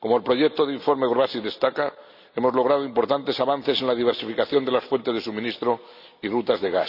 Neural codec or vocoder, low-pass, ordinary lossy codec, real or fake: none; 5.4 kHz; none; real